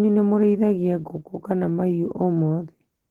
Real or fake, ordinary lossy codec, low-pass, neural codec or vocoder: real; Opus, 16 kbps; 19.8 kHz; none